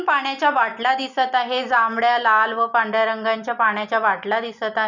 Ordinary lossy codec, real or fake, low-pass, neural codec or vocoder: none; real; 7.2 kHz; none